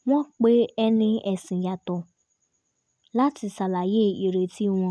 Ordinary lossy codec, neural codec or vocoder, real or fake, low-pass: none; none; real; none